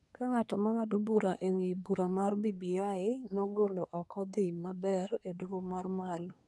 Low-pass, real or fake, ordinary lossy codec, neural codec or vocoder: none; fake; none; codec, 24 kHz, 1 kbps, SNAC